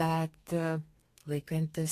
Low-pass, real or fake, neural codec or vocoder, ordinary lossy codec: 14.4 kHz; fake; codec, 32 kHz, 1.9 kbps, SNAC; AAC, 48 kbps